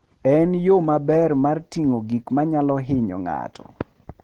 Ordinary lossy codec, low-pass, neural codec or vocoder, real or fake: Opus, 16 kbps; 19.8 kHz; none; real